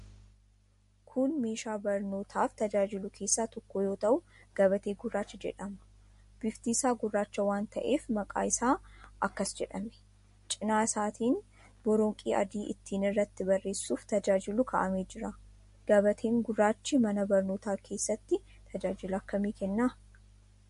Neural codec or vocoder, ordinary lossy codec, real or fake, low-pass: none; MP3, 48 kbps; real; 10.8 kHz